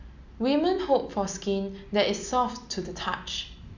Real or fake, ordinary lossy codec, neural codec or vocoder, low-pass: real; none; none; 7.2 kHz